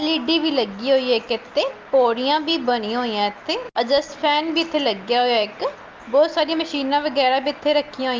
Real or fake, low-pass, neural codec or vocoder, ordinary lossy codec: real; 7.2 kHz; none; Opus, 24 kbps